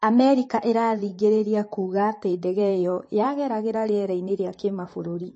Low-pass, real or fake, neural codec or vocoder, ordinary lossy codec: 10.8 kHz; fake; vocoder, 44.1 kHz, 128 mel bands, Pupu-Vocoder; MP3, 32 kbps